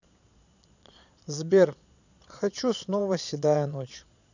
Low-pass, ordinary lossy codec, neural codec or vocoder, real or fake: 7.2 kHz; none; vocoder, 22.05 kHz, 80 mel bands, Vocos; fake